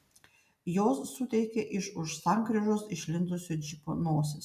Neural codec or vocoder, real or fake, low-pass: none; real; 14.4 kHz